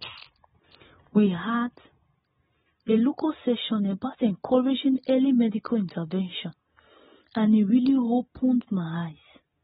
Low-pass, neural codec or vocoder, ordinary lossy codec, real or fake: 7.2 kHz; none; AAC, 16 kbps; real